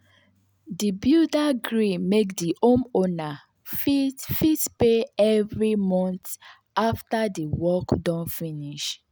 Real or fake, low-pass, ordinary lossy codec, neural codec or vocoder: real; none; none; none